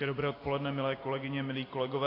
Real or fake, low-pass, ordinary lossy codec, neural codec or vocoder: real; 5.4 kHz; AAC, 24 kbps; none